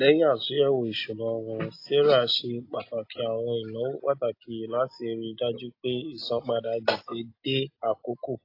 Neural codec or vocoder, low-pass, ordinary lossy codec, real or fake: none; 5.4 kHz; AAC, 32 kbps; real